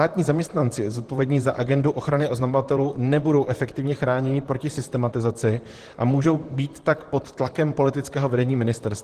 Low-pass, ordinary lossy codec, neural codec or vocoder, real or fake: 14.4 kHz; Opus, 16 kbps; vocoder, 44.1 kHz, 128 mel bands, Pupu-Vocoder; fake